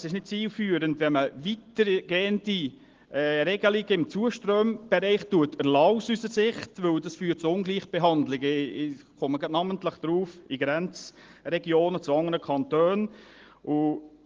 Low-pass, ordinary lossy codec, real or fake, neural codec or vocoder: 7.2 kHz; Opus, 16 kbps; real; none